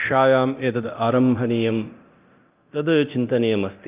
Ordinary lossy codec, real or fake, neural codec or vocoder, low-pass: Opus, 24 kbps; fake; codec, 24 kHz, 0.9 kbps, DualCodec; 3.6 kHz